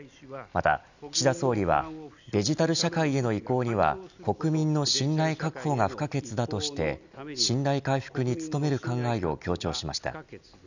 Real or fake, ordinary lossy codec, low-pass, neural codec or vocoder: real; none; 7.2 kHz; none